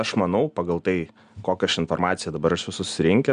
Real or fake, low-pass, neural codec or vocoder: real; 9.9 kHz; none